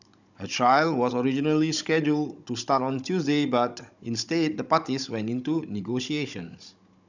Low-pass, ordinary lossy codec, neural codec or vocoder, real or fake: 7.2 kHz; none; codec, 16 kHz, 16 kbps, FunCodec, trained on Chinese and English, 50 frames a second; fake